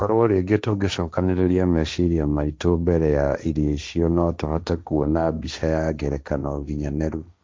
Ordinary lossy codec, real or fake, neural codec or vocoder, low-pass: none; fake; codec, 16 kHz, 1.1 kbps, Voila-Tokenizer; none